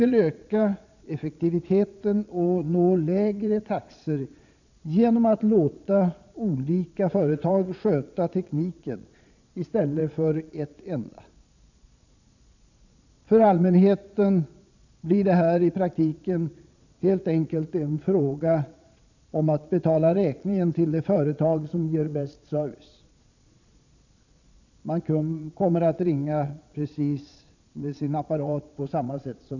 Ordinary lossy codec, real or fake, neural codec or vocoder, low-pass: none; fake; vocoder, 22.05 kHz, 80 mel bands, Vocos; 7.2 kHz